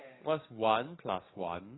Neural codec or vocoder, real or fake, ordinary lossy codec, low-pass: codec, 44.1 kHz, 7.8 kbps, DAC; fake; AAC, 16 kbps; 7.2 kHz